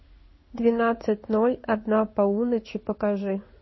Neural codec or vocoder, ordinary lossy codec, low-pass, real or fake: codec, 16 kHz in and 24 kHz out, 1 kbps, XY-Tokenizer; MP3, 24 kbps; 7.2 kHz; fake